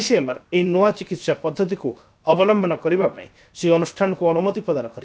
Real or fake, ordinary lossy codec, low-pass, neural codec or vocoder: fake; none; none; codec, 16 kHz, 0.7 kbps, FocalCodec